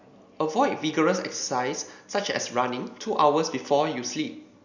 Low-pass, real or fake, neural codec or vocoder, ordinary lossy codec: 7.2 kHz; real; none; none